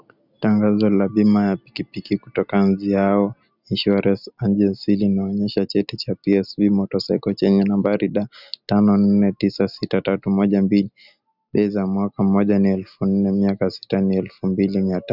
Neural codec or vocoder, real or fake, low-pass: none; real; 5.4 kHz